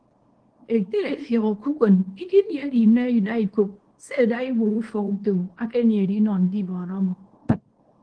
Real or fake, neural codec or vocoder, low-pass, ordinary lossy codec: fake; codec, 24 kHz, 0.9 kbps, WavTokenizer, small release; 9.9 kHz; Opus, 16 kbps